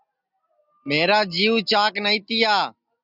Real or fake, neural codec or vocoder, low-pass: real; none; 5.4 kHz